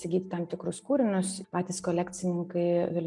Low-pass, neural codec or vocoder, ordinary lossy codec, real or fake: 10.8 kHz; none; MP3, 96 kbps; real